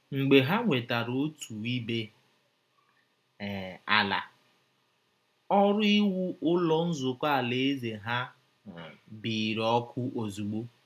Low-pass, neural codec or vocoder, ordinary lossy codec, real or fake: 14.4 kHz; none; none; real